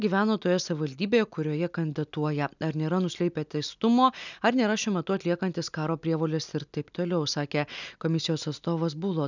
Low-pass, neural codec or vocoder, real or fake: 7.2 kHz; none; real